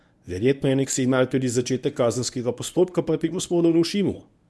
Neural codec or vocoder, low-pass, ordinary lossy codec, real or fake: codec, 24 kHz, 0.9 kbps, WavTokenizer, medium speech release version 1; none; none; fake